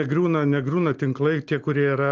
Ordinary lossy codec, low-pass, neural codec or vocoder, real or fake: Opus, 32 kbps; 7.2 kHz; none; real